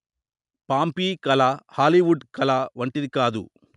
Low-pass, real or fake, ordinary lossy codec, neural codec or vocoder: 10.8 kHz; real; none; none